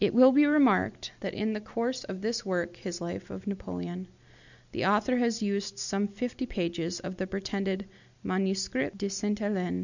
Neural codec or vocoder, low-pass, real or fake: none; 7.2 kHz; real